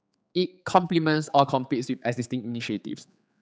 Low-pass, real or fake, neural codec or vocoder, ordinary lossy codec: none; fake; codec, 16 kHz, 4 kbps, X-Codec, HuBERT features, trained on general audio; none